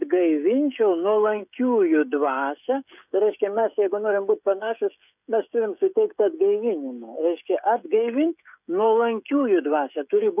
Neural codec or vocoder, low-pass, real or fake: codec, 16 kHz, 16 kbps, FreqCodec, smaller model; 3.6 kHz; fake